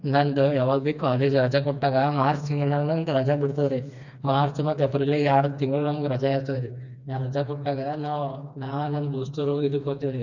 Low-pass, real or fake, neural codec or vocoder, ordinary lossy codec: 7.2 kHz; fake; codec, 16 kHz, 2 kbps, FreqCodec, smaller model; none